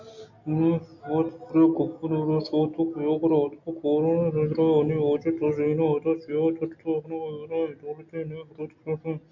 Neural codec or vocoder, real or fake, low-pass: none; real; 7.2 kHz